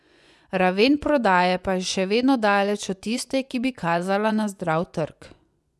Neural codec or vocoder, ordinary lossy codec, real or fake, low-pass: vocoder, 24 kHz, 100 mel bands, Vocos; none; fake; none